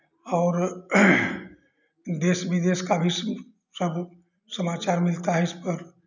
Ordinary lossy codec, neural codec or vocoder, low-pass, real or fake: none; none; 7.2 kHz; real